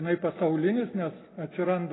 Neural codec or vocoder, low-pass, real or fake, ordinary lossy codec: none; 7.2 kHz; real; AAC, 16 kbps